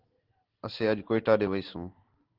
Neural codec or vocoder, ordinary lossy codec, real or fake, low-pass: none; Opus, 16 kbps; real; 5.4 kHz